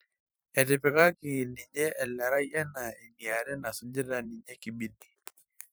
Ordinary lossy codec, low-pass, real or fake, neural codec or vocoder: none; none; fake; vocoder, 44.1 kHz, 128 mel bands every 512 samples, BigVGAN v2